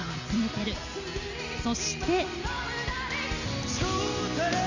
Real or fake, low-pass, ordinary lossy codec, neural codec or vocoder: real; 7.2 kHz; none; none